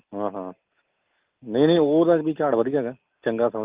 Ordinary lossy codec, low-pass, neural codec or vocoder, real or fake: Opus, 32 kbps; 3.6 kHz; none; real